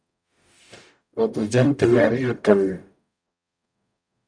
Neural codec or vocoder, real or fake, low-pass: codec, 44.1 kHz, 0.9 kbps, DAC; fake; 9.9 kHz